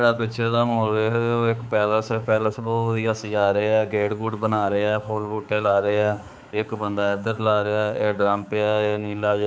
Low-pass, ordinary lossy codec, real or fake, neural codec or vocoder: none; none; fake; codec, 16 kHz, 4 kbps, X-Codec, HuBERT features, trained on balanced general audio